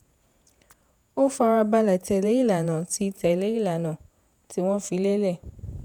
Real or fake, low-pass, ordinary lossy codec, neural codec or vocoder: fake; none; none; vocoder, 48 kHz, 128 mel bands, Vocos